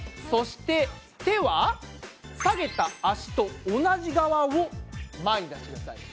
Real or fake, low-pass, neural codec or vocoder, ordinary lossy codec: real; none; none; none